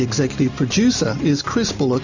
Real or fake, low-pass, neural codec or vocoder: real; 7.2 kHz; none